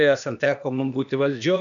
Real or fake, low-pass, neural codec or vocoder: fake; 7.2 kHz; codec, 16 kHz, 0.8 kbps, ZipCodec